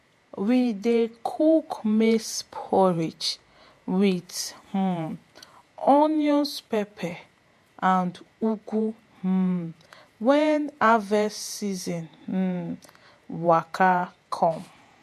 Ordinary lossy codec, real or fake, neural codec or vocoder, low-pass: MP3, 64 kbps; fake; vocoder, 48 kHz, 128 mel bands, Vocos; 14.4 kHz